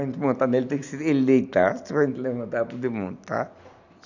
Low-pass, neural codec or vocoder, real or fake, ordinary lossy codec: 7.2 kHz; none; real; none